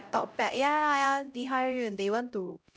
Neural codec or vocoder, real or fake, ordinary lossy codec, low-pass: codec, 16 kHz, 0.5 kbps, X-Codec, HuBERT features, trained on LibriSpeech; fake; none; none